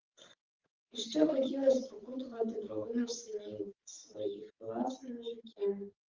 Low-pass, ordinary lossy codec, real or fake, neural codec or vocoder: 7.2 kHz; Opus, 16 kbps; fake; codec, 16 kHz, 16 kbps, FreqCodec, smaller model